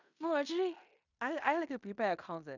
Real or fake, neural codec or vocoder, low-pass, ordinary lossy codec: fake; codec, 16 kHz in and 24 kHz out, 0.9 kbps, LongCat-Audio-Codec, fine tuned four codebook decoder; 7.2 kHz; none